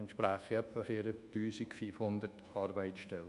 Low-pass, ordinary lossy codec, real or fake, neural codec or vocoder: 10.8 kHz; MP3, 64 kbps; fake; codec, 24 kHz, 1.2 kbps, DualCodec